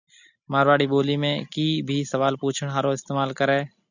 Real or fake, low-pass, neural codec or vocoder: real; 7.2 kHz; none